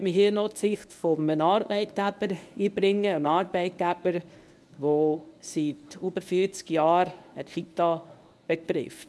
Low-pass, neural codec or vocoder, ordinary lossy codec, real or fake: none; codec, 24 kHz, 0.9 kbps, WavTokenizer, medium speech release version 1; none; fake